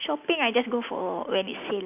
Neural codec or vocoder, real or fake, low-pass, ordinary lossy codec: none; real; 3.6 kHz; none